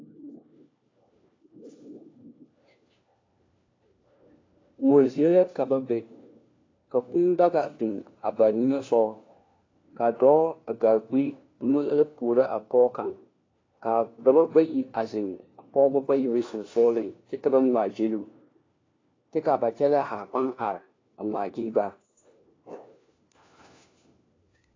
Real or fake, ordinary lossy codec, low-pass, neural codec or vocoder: fake; AAC, 32 kbps; 7.2 kHz; codec, 16 kHz, 1 kbps, FunCodec, trained on LibriTTS, 50 frames a second